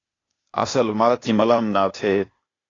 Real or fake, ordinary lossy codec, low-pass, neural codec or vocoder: fake; AAC, 32 kbps; 7.2 kHz; codec, 16 kHz, 0.8 kbps, ZipCodec